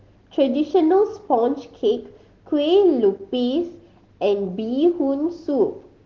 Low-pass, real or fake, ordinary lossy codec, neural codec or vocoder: 7.2 kHz; real; Opus, 16 kbps; none